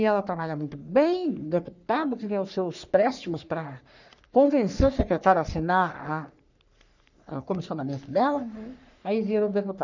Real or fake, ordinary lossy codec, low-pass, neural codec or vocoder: fake; none; 7.2 kHz; codec, 44.1 kHz, 3.4 kbps, Pupu-Codec